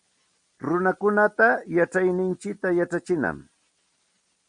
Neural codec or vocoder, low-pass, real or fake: none; 9.9 kHz; real